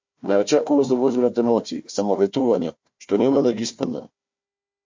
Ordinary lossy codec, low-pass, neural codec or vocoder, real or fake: MP3, 48 kbps; 7.2 kHz; codec, 16 kHz, 1 kbps, FunCodec, trained on Chinese and English, 50 frames a second; fake